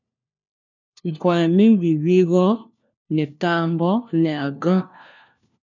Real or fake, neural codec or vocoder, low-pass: fake; codec, 16 kHz, 1 kbps, FunCodec, trained on LibriTTS, 50 frames a second; 7.2 kHz